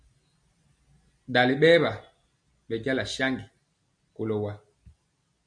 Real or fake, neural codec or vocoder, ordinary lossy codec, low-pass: real; none; MP3, 96 kbps; 9.9 kHz